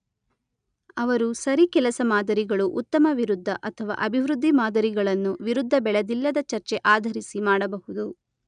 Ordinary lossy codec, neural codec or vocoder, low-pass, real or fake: none; none; 9.9 kHz; real